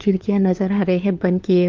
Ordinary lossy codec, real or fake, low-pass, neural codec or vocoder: Opus, 32 kbps; fake; 7.2 kHz; codec, 16 kHz, 4 kbps, FunCodec, trained on LibriTTS, 50 frames a second